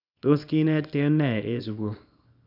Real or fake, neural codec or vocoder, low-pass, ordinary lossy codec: fake; codec, 24 kHz, 0.9 kbps, WavTokenizer, small release; 5.4 kHz; none